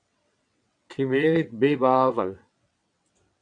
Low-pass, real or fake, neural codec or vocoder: 9.9 kHz; fake; vocoder, 22.05 kHz, 80 mel bands, WaveNeXt